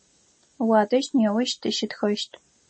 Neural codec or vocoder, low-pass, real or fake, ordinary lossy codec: none; 9.9 kHz; real; MP3, 32 kbps